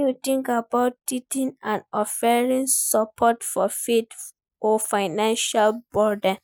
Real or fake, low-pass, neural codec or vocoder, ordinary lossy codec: real; none; none; none